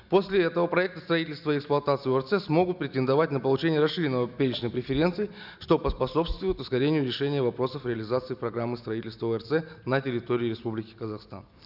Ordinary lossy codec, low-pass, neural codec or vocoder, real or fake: none; 5.4 kHz; none; real